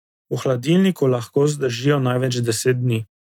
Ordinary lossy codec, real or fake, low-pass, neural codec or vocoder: none; real; 19.8 kHz; none